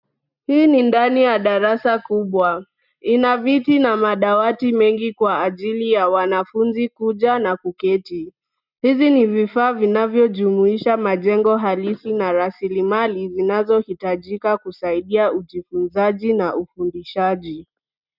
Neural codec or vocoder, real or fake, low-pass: none; real; 5.4 kHz